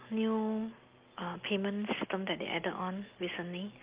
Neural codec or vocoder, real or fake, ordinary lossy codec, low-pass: none; real; Opus, 24 kbps; 3.6 kHz